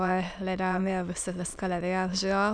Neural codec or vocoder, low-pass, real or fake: autoencoder, 22.05 kHz, a latent of 192 numbers a frame, VITS, trained on many speakers; 9.9 kHz; fake